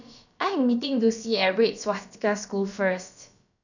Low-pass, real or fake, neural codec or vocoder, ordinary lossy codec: 7.2 kHz; fake; codec, 16 kHz, about 1 kbps, DyCAST, with the encoder's durations; none